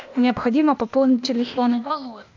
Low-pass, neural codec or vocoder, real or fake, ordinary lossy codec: 7.2 kHz; codec, 16 kHz, 0.8 kbps, ZipCodec; fake; MP3, 64 kbps